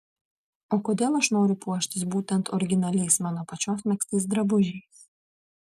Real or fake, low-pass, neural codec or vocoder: real; 14.4 kHz; none